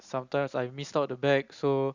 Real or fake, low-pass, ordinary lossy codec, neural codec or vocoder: real; 7.2 kHz; Opus, 64 kbps; none